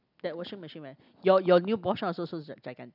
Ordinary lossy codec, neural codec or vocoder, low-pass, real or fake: none; none; 5.4 kHz; real